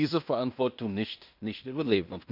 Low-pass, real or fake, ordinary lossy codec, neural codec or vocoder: 5.4 kHz; fake; none; codec, 16 kHz in and 24 kHz out, 0.9 kbps, LongCat-Audio-Codec, fine tuned four codebook decoder